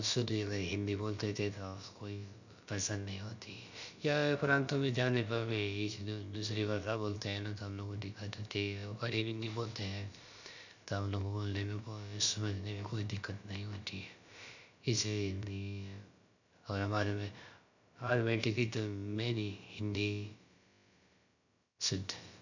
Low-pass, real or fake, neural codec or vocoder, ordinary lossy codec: 7.2 kHz; fake; codec, 16 kHz, about 1 kbps, DyCAST, with the encoder's durations; none